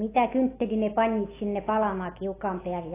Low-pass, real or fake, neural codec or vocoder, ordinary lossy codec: 3.6 kHz; real; none; AAC, 16 kbps